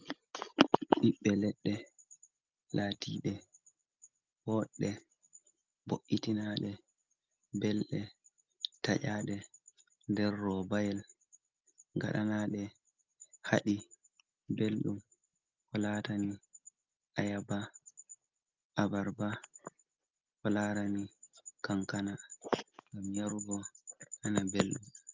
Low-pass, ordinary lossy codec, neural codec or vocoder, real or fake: 7.2 kHz; Opus, 24 kbps; none; real